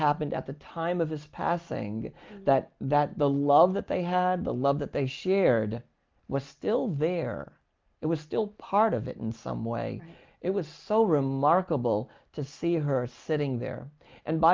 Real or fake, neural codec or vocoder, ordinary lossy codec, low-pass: real; none; Opus, 24 kbps; 7.2 kHz